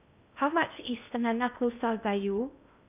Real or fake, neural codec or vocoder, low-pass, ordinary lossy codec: fake; codec, 16 kHz in and 24 kHz out, 0.6 kbps, FocalCodec, streaming, 2048 codes; 3.6 kHz; none